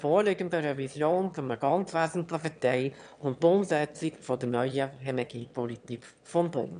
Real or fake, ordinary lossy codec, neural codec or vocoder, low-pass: fake; none; autoencoder, 22.05 kHz, a latent of 192 numbers a frame, VITS, trained on one speaker; 9.9 kHz